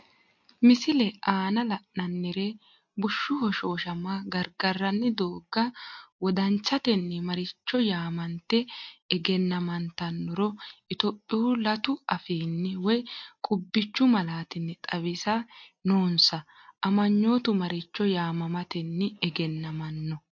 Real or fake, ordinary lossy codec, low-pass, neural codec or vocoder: real; MP3, 48 kbps; 7.2 kHz; none